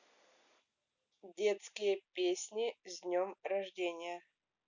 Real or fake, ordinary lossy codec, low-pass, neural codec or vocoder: real; none; 7.2 kHz; none